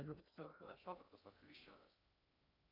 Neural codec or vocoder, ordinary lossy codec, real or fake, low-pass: codec, 16 kHz in and 24 kHz out, 0.6 kbps, FocalCodec, streaming, 2048 codes; MP3, 48 kbps; fake; 5.4 kHz